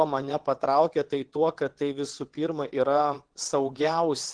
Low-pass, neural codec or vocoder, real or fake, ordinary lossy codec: 9.9 kHz; vocoder, 22.05 kHz, 80 mel bands, WaveNeXt; fake; Opus, 16 kbps